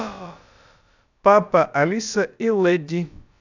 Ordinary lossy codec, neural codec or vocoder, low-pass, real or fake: none; codec, 16 kHz, about 1 kbps, DyCAST, with the encoder's durations; 7.2 kHz; fake